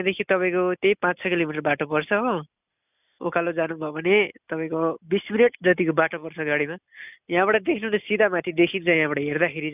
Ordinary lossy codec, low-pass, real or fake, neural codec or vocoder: none; 3.6 kHz; real; none